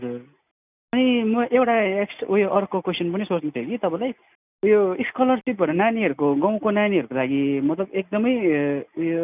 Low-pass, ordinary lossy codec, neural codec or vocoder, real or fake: 3.6 kHz; none; none; real